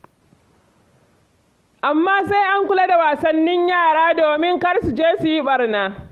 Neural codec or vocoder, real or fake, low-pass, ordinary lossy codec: none; real; 14.4 kHz; Opus, 24 kbps